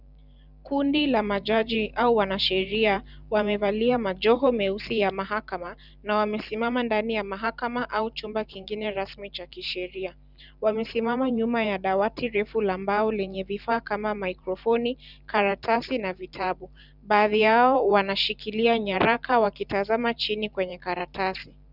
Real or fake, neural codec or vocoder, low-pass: fake; vocoder, 44.1 kHz, 128 mel bands every 512 samples, BigVGAN v2; 5.4 kHz